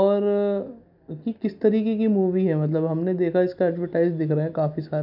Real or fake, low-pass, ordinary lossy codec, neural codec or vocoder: real; 5.4 kHz; none; none